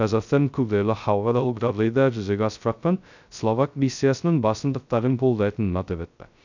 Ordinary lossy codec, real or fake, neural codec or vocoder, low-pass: none; fake; codec, 16 kHz, 0.2 kbps, FocalCodec; 7.2 kHz